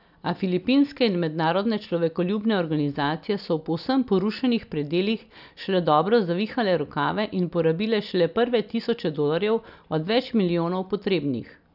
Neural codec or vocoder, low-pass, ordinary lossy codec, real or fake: none; 5.4 kHz; none; real